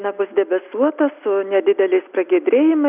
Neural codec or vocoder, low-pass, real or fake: none; 3.6 kHz; real